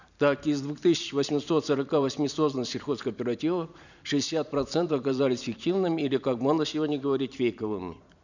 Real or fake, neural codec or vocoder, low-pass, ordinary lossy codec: fake; vocoder, 44.1 kHz, 128 mel bands every 512 samples, BigVGAN v2; 7.2 kHz; none